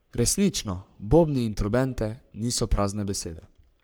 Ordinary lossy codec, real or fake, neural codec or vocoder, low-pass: none; fake; codec, 44.1 kHz, 3.4 kbps, Pupu-Codec; none